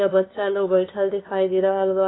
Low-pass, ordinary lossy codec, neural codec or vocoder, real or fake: 7.2 kHz; AAC, 16 kbps; codec, 16 kHz, 2 kbps, FunCodec, trained on LibriTTS, 25 frames a second; fake